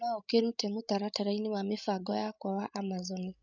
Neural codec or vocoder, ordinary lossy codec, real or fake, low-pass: codec, 16 kHz, 16 kbps, FreqCodec, larger model; none; fake; 7.2 kHz